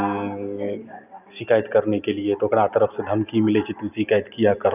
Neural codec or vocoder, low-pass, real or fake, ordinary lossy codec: none; 3.6 kHz; real; none